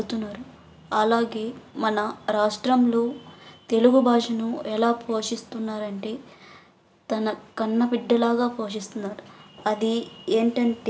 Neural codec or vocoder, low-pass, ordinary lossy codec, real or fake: none; none; none; real